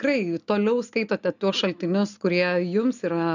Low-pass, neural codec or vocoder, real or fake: 7.2 kHz; none; real